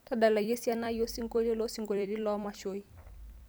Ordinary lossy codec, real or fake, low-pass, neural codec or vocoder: none; fake; none; vocoder, 44.1 kHz, 128 mel bands every 256 samples, BigVGAN v2